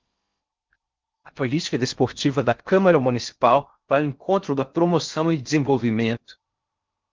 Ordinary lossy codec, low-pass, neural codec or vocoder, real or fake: Opus, 32 kbps; 7.2 kHz; codec, 16 kHz in and 24 kHz out, 0.6 kbps, FocalCodec, streaming, 4096 codes; fake